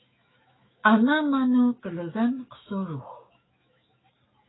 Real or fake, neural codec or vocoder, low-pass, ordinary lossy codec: fake; autoencoder, 48 kHz, 128 numbers a frame, DAC-VAE, trained on Japanese speech; 7.2 kHz; AAC, 16 kbps